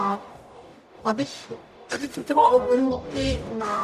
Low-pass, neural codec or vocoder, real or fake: 14.4 kHz; codec, 44.1 kHz, 0.9 kbps, DAC; fake